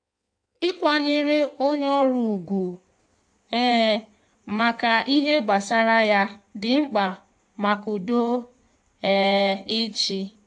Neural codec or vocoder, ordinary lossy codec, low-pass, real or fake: codec, 16 kHz in and 24 kHz out, 1.1 kbps, FireRedTTS-2 codec; AAC, 64 kbps; 9.9 kHz; fake